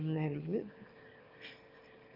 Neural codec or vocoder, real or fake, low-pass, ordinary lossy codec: autoencoder, 22.05 kHz, a latent of 192 numbers a frame, VITS, trained on one speaker; fake; 5.4 kHz; Opus, 24 kbps